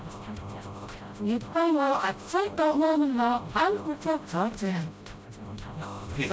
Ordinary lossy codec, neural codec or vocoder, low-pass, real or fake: none; codec, 16 kHz, 0.5 kbps, FreqCodec, smaller model; none; fake